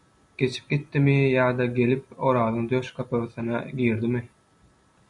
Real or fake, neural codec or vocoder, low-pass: real; none; 10.8 kHz